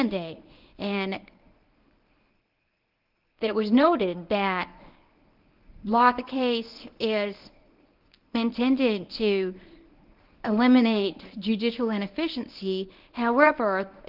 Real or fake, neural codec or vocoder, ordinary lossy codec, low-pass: fake; codec, 24 kHz, 0.9 kbps, WavTokenizer, medium speech release version 1; Opus, 32 kbps; 5.4 kHz